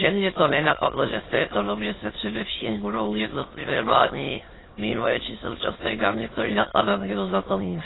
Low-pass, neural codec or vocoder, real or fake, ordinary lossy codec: 7.2 kHz; autoencoder, 22.05 kHz, a latent of 192 numbers a frame, VITS, trained on many speakers; fake; AAC, 16 kbps